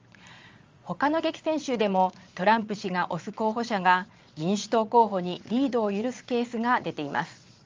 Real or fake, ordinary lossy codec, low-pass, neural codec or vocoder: fake; Opus, 32 kbps; 7.2 kHz; vocoder, 22.05 kHz, 80 mel bands, Vocos